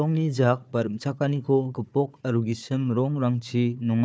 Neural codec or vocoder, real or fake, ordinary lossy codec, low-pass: codec, 16 kHz, 4 kbps, FunCodec, trained on Chinese and English, 50 frames a second; fake; none; none